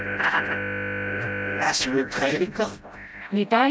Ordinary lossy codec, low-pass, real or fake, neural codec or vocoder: none; none; fake; codec, 16 kHz, 0.5 kbps, FreqCodec, smaller model